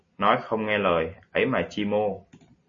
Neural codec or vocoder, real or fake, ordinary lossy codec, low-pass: none; real; MP3, 32 kbps; 7.2 kHz